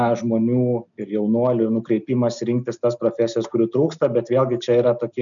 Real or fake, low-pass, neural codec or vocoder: real; 7.2 kHz; none